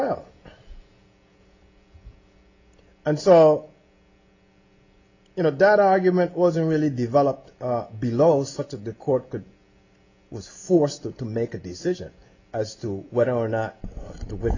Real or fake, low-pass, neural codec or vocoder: real; 7.2 kHz; none